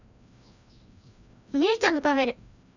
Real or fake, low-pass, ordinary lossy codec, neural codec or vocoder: fake; 7.2 kHz; none; codec, 16 kHz, 1 kbps, FreqCodec, larger model